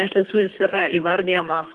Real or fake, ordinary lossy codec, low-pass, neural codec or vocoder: fake; Opus, 24 kbps; 10.8 kHz; codec, 24 kHz, 3 kbps, HILCodec